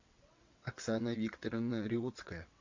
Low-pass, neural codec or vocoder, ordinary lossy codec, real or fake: 7.2 kHz; vocoder, 22.05 kHz, 80 mel bands, WaveNeXt; MP3, 64 kbps; fake